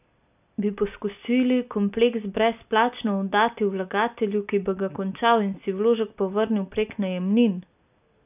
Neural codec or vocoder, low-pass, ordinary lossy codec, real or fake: none; 3.6 kHz; none; real